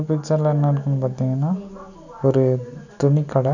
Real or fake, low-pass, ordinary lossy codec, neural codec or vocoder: real; 7.2 kHz; none; none